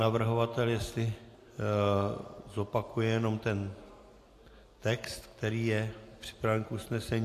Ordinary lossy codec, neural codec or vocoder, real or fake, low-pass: AAC, 64 kbps; none; real; 14.4 kHz